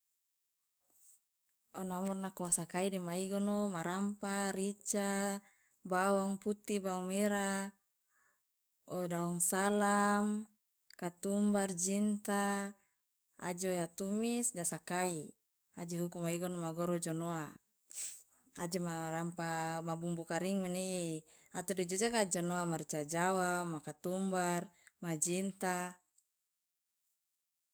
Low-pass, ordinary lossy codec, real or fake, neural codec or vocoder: none; none; fake; codec, 44.1 kHz, 7.8 kbps, DAC